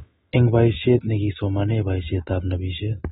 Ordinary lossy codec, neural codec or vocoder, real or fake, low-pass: AAC, 16 kbps; none; real; 19.8 kHz